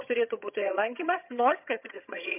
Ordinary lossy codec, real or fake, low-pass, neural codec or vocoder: MP3, 32 kbps; fake; 3.6 kHz; vocoder, 22.05 kHz, 80 mel bands, HiFi-GAN